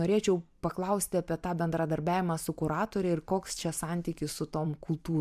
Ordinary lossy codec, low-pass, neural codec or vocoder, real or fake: MP3, 96 kbps; 14.4 kHz; vocoder, 44.1 kHz, 128 mel bands every 512 samples, BigVGAN v2; fake